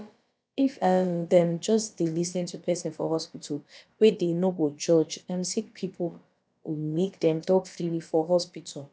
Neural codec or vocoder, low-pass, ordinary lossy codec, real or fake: codec, 16 kHz, about 1 kbps, DyCAST, with the encoder's durations; none; none; fake